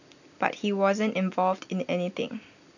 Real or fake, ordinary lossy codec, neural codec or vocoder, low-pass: real; none; none; 7.2 kHz